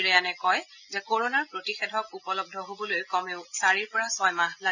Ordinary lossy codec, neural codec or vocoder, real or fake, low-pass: none; none; real; 7.2 kHz